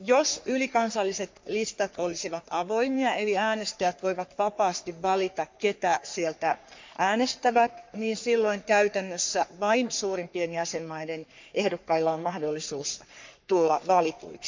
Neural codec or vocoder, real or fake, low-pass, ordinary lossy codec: codec, 44.1 kHz, 3.4 kbps, Pupu-Codec; fake; 7.2 kHz; MP3, 64 kbps